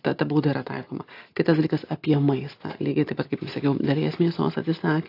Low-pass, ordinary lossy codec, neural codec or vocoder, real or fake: 5.4 kHz; AAC, 32 kbps; none; real